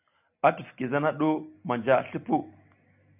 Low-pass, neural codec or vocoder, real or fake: 3.6 kHz; none; real